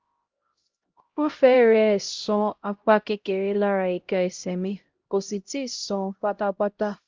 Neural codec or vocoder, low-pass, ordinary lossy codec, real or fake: codec, 16 kHz, 0.5 kbps, X-Codec, HuBERT features, trained on LibriSpeech; 7.2 kHz; Opus, 24 kbps; fake